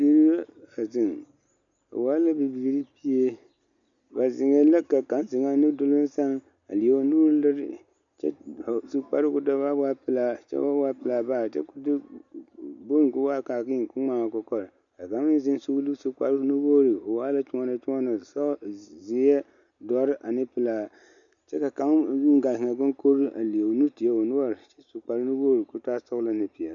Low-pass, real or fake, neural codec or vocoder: 7.2 kHz; real; none